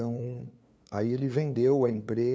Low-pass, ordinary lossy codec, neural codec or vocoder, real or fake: none; none; codec, 16 kHz, 2 kbps, FunCodec, trained on LibriTTS, 25 frames a second; fake